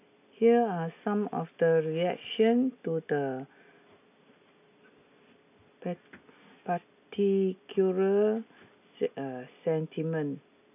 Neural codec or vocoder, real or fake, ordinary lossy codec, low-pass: none; real; none; 3.6 kHz